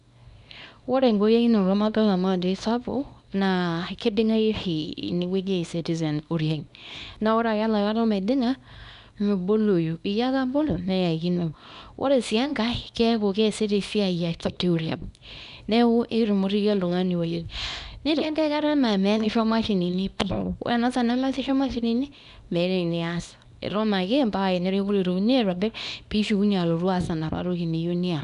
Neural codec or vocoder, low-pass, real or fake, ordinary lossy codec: codec, 24 kHz, 0.9 kbps, WavTokenizer, small release; 10.8 kHz; fake; none